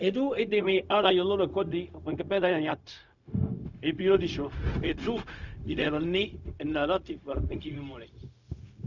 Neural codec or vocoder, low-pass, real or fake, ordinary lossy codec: codec, 16 kHz, 0.4 kbps, LongCat-Audio-Codec; 7.2 kHz; fake; none